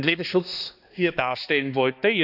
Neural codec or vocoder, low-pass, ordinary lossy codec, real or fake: codec, 16 kHz, 2 kbps, X-Codec, HuBERT features, trained on balanced general audio; 5.4 kHz; none; fake